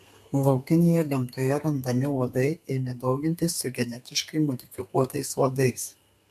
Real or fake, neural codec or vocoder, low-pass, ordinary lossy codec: fake; codec, 32 kHz, 1.9 kbps, SNAC; 14.4 kHz; MP3, 96 kbps